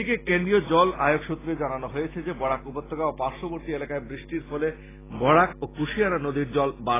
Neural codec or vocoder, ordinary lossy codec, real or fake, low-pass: none; AAC, 16 kbps; real; 3.6 kHz